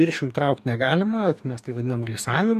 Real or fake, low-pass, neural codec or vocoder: fake; 14.4 kHz; codec, 44.1 kHz, 2.6 kbps, DAC